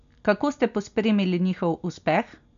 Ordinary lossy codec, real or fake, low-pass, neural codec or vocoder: none; real; 7.2 kHz; none